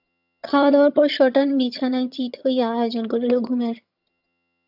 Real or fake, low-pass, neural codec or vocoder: fake; 5.4 kHz; vocoder, 22.05 kHz, 80 mel bands, HiFi-GAN